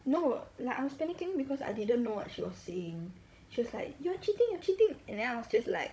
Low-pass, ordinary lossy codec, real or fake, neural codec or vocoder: none; none; fake; codec, 16 kHz, 16 kbps, FunCodec, trained on Chinese and English, 50 frames a second